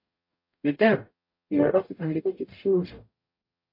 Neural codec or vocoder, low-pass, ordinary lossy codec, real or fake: codec, 44.1 kHz, 0.9 kbps, DAC; 5.4 kHz; MP3, 32 kbps; fake